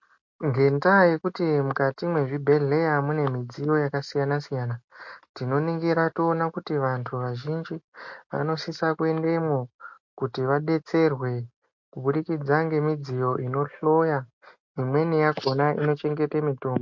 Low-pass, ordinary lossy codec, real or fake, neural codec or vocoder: 7.2 kHz; MP3, 48 kbps; real; none